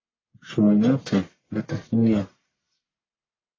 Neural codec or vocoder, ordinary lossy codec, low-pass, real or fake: codec, 44.1 kHz, 1.7 kbps, Pupu-Codec; AAC, 32 kbps; 7.2 kHz; fake